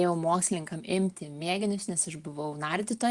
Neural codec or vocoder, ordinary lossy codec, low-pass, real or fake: none; Opus, 32 kbps; 10.8 kHz; real